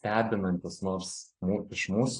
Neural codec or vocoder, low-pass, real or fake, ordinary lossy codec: none; 9.9 kHz; real; AAC, 32 kbps